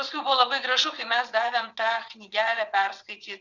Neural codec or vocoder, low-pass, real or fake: vocoder, 22.05 kHz, 80 mel bands, WaveNeXt; 7.2 kHz; fake